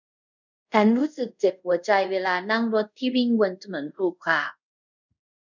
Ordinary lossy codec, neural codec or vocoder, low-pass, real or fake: none; codec, 24 kHz, 0.5 kbps, DualCodec; 7.2 kHz; fake